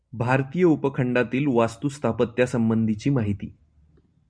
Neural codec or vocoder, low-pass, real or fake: none; 9.9 kHz; real